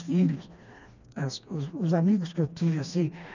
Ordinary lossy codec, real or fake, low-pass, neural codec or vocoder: none; fake; 7.2 kHz; codec, 16 kHz, 2 kbps, FreqCodec, smaller model